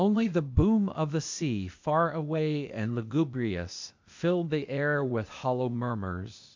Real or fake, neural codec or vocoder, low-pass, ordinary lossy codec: fake; codec, 16 kHz, 0.8 kbps, ZipCodec; 7.2 kHz; MP3, 64 kbps